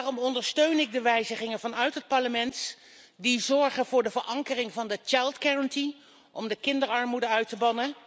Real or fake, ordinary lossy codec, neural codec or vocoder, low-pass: real; none; none; none